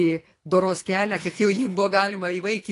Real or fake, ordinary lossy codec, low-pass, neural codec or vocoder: fake; AAC, 48 kbps; 10.8 kHz; codec, 24 kHz, 3 kbps, HILCodec